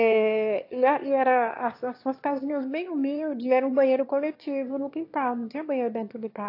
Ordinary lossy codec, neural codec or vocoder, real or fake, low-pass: AAC, 32 kbps; autoencoder, 22.05 kHz, a latent of 192 numbers a frame, VITS, trained on one speaker; fake; 5.4 kHz